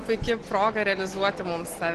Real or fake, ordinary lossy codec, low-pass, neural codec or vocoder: real; Opus, 16 kbps; 10.8 kHz; none